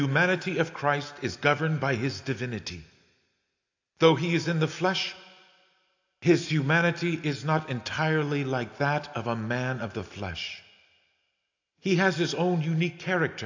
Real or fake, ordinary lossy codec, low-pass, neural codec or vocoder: real; AAC, 48 kbps; 7.2 kHz; none